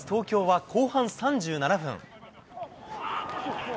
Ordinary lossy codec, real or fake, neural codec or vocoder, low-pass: none; real; none; none